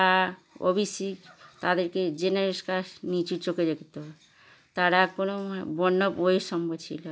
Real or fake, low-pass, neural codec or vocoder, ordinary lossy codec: real; none; none; none